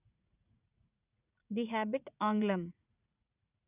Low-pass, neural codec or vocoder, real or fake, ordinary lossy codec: 3.6 kHz; vocoder, 44.1 kHz, 80 mel bands, Vocos; fake; none